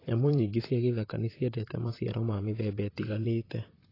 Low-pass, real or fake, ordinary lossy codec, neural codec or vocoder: 5.4 kHz; fake; AAC, 24 kbps; vocoder, 44.1 kHz, 128 mel bands, Pupu-Vocoder